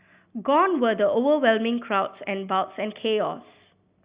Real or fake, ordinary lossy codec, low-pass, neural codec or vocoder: real; Opus, 64 kbps; 3.6 kHz; none